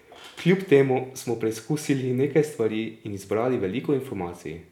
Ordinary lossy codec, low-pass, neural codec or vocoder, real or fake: none; 19.8 kHz; none; real